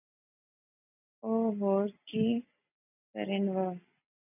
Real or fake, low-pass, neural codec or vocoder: real; 3.6 kHz; none